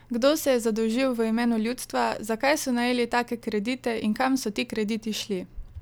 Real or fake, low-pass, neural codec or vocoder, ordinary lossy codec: real; none; none; none